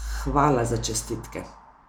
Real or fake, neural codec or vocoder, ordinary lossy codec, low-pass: real; none; none; none